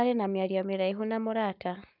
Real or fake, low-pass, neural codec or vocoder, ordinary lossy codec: fake; 5.4 kHz; codec, 44.1 kHz, 7.8 kbps, Pupu-Codec; none